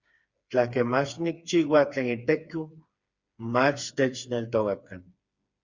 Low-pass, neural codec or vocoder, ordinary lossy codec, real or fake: 7.2 kHz; codec, 16 kHz, 4 kbps, FreqCodec, smaller model; Opus, 64 kbps; fake